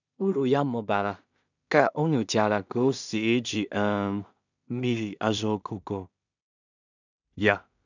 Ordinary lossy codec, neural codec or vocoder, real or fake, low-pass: none; codec, 16 kHz in and 24 kHz out, 0.4 kbps, LongCat-Audio-Codec, two codebook decoder; fake; 7.2 kHz